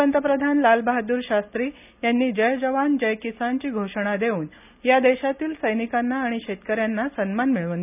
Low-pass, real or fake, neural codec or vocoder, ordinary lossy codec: 3.6 kHz; real; none; none